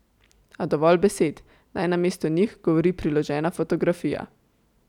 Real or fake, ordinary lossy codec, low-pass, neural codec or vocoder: real; none; 19.8 kHz; none